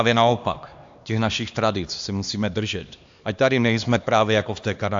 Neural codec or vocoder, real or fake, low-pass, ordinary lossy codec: codec, 16 kHz, 2 kbps, X-Codec, HuBERT features, trained on LibriSpeech; fake; 7.2 kHz; AAC, 64 kbps